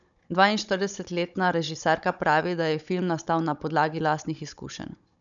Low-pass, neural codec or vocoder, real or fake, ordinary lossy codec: 7.2 kHz; codec, 16 kHz, 16 kbps, FunCodec, trained on Chinese and English, 50 frames a second; fake; none